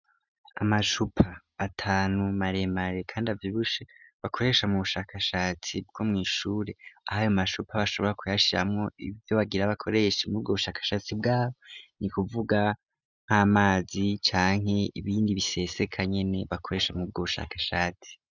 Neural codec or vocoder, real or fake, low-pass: none; real; 7.2 kHz